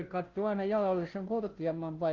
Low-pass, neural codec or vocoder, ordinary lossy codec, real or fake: 7.2 kHz; codec, 16 kHz, 0.5 kbps, FunCodec, trained on Chinese and English, 25 frames a second; Opus, 16 kbps; fake